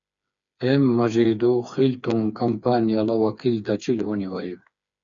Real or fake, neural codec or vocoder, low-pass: fake; codec, 16 kHz, 4 kbps, FreqCodec, smaller model; 7.2 kHz